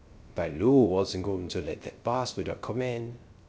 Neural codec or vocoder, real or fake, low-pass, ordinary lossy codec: codec, 16 kHz, 0.3 kbps, FocalCodec; fake; none; none